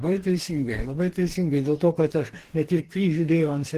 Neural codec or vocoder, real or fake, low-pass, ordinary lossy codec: codec, 44.1 kHz, 2.6 kbps, DAC; fake; 14.4 kHz; Opus, 16 kbps